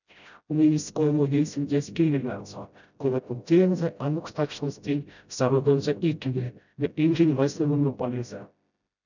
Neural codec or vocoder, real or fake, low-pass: codec, 16 kHz, 0.5 kbps, FreqCodec, smaller model; fake; 7.2 kHz